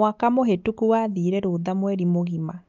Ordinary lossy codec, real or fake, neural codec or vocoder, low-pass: Opus, 24 kbps; real; none; 7.2 kHz